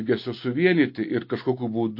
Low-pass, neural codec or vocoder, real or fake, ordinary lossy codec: 5.4 kHz; none; real; MP3, 32 kbps